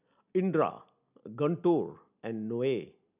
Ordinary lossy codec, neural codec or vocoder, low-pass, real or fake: none; none; 3.6 kHz; real